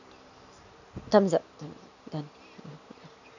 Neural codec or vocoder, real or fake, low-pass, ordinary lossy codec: none; real; 7.2 kHz; none